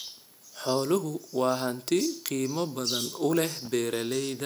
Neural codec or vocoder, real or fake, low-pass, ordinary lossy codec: none; real; none; none